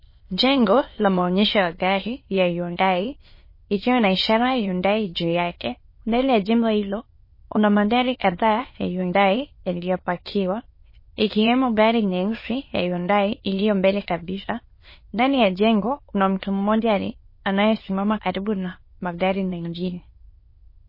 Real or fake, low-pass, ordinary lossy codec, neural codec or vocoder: fake; 5.4 kHz; MP3, 24 kbps; autoencoder, 22.05 kHz, a latent of 192 numbers a frame, VITS, trained on many speakers